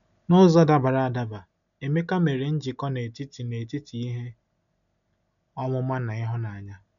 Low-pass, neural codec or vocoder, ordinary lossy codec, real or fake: 7.2 kHz; none; none; real